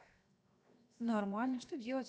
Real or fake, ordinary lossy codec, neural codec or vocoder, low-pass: fake; none; codec, 16 kHz, 0.7 kbps, FocalCodec; none